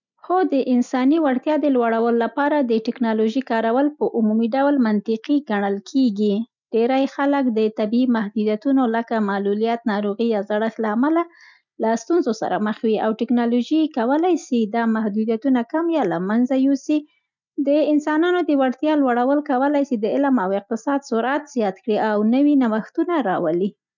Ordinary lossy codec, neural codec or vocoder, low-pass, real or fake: none; none; 7.2 kHz; real